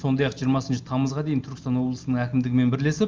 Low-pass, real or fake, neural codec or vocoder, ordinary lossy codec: 7.2 kHz; real; none; Opus, 24 kbps